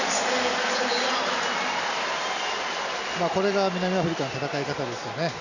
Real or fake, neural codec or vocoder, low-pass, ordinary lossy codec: real; none; 7.2 kHz; none